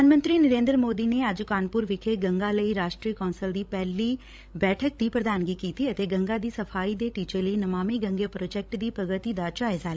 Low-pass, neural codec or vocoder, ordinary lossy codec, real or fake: none; codec, 16 kHz, 16 kbps, FreqCodec, larger model; none; fake